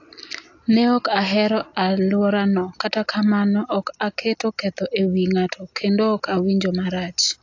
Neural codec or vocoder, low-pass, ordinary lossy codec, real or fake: none; 7.2 kHz; AAC, 48 kbps; real